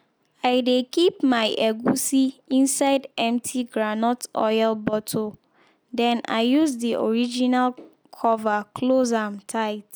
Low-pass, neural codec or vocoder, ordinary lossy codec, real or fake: none; none; none; real